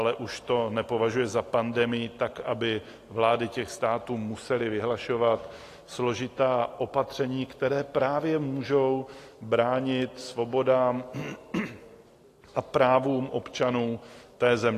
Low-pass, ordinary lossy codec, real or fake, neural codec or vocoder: 14.4 kHz; AAC, 48 kbps; real; none